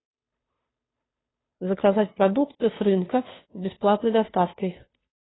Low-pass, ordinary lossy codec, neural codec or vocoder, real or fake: 7.2 kHz; AAC, 16 kbps; codec, 16 kHz, 2 kbps, FunCodec, trained on Chinese and English, 25 frames a second; fake